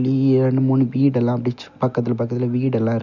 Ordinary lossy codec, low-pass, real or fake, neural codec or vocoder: none; 7.2 kHz; real; none